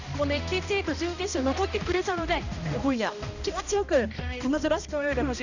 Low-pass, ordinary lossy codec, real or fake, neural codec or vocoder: 7.2 kHz; none; fake; codec, 16 kHz, 1 kbps, X-Codec, HuBERT features, trained on balanced general audio